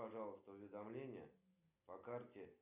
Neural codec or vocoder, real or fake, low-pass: none; real; 3.6 kHz